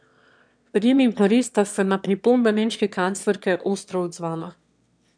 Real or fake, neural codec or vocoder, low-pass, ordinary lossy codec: fake; autoencoder, 22.05 kHz, a latent of 192 numbers a frame, VITS, trained on one speaker; 9.9 kHz; none